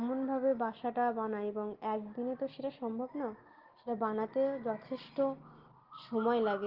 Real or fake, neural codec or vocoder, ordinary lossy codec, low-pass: real; none; Opus, 16 kbps; 5.4 kHz